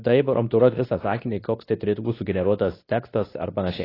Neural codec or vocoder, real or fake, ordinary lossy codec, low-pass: codec, 24 kHz, 0.9 kbps, WavTokenizer, medium speech release version 2; fake; AAC, 24 kbps; 5.4 kHz